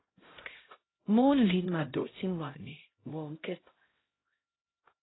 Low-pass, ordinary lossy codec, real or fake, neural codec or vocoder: 7.2 kHz; AAC, 16 kbps; fake; codec, 16 kHz, 0.5 kbps, X-Codec, HuBERT features, trained on LibriSpeech